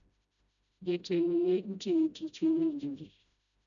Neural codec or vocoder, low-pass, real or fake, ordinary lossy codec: codec, 16 kHz, 0.5 kbps, FreqCodec, smaller model; 7.2 kHz; fake; none